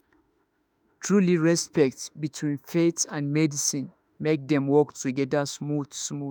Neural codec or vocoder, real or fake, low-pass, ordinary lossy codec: autoencoder, 48 kHz, 32 numbers a frame, DAC-VAE, trained on Japanese speech; fake; none; none